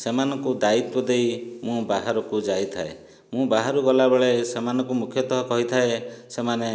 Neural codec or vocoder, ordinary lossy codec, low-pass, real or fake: none; none; none; real